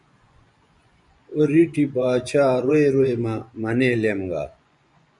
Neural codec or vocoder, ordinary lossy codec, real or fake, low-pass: vocoder, 24 kHz, 100 mel bands, Vocos; MP3, 96 kbps; fake; 10.8 kHz